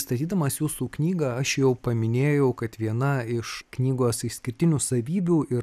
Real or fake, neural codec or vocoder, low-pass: real; none; 14.4 kHz